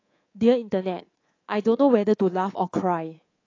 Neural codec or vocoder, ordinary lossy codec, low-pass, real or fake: codec, 24 kHz, 3.1 kbps, DualCodec; AAC, 32 kbps; 7.2 kHz; fake